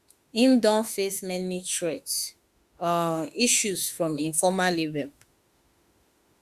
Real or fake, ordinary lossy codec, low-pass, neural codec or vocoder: fake; Opus, 64 kbps; 14.4 kHz; autoencoder, 48 kHz, 32 numbers a frame, DAC-VAE, trained on Japanese speech